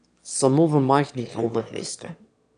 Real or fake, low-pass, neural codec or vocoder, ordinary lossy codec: fake; 9.9 kHz; autoencoder, 22.05 kHz, a latent of 192 numbers a frame, VITS, trained on one speaker; none